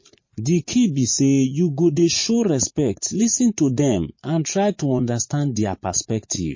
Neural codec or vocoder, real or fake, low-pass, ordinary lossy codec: vocoder, 44.1 kHz, 80 mel bands, Vocos; fake; 7.2 kHz; MP3, 32 kbps